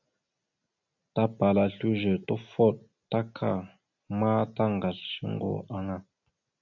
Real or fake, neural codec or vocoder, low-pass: real; none; 7.2 kHz